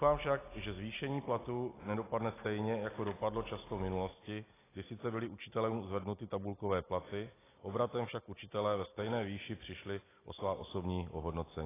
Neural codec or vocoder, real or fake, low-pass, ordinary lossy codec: none; real; 3.6 kHz; AAC, 16 kbps